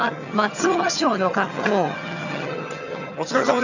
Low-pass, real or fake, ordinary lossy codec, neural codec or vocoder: 7.2 kHz; fake; none; vocoder, 22.05 kHz, 80 mel bands, HiFi-GAN